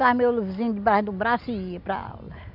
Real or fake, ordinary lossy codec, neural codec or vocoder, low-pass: real; none; none; 5.4 kHz